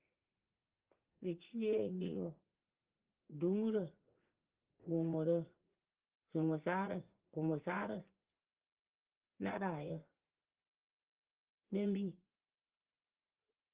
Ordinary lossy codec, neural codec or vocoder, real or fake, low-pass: Opus, 16 kbps; vocoder, 44.1 kHz, 80 mel bands, Vocos; fake; 3.6 kHz